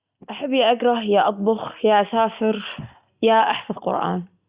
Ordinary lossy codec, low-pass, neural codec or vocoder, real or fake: Opus, 64 kbps; 3.6 kHz; none; real